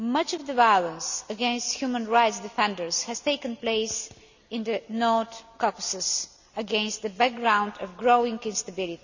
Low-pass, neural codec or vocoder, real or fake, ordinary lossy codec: 7.2 kHz; none; real; none